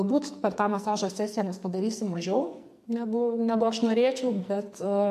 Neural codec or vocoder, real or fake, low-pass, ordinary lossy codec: codec, 44.1 kHz, 2.6 kbps, SNAC; fake; 14.4 kHz; MP3, 64 kbps